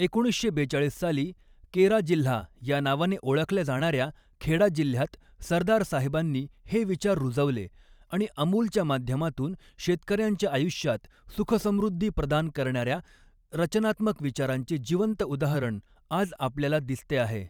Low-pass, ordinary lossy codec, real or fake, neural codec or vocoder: 19.8 kHz; none; fake; vocoder, 44.1 kHz, 128 mel bands every 512 samples, BigVGAN v2